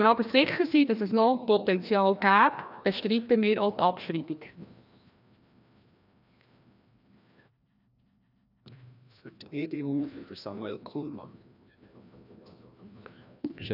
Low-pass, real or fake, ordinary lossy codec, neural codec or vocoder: 5.4 kHz; fake; none; codec, 16 kHz, 1 kbps, FreqCodec, larger model